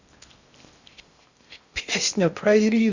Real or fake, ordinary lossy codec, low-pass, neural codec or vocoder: fake; Opus, 64 kbps; 7.2 kHz; codec, 16 kHz in and 24 kHz out, 0.6 kbps, FocalCodec, streaming, 4096 codes